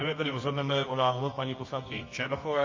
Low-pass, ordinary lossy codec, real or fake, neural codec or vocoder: 7.2 kHz; MP3, 32 kbps; fake; codec, 24 kHz, 0.9 kbps, WavTokenizer, medium music audio release